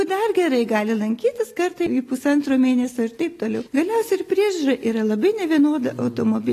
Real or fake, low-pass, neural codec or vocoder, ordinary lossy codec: real; 14.4 kHz; none; AAC, 48 kbps